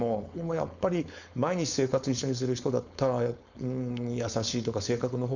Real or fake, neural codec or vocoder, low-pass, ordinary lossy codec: fake; codec, 16 kHz, 4.8 kbps, FACodec; 7.2 kHz; none